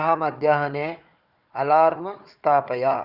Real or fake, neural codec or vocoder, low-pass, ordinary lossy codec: fake; codec, 16 kHz, 4 kbps, FunCodec, trained on Chinese and English, 50 frames a second; 5.4 kHz; none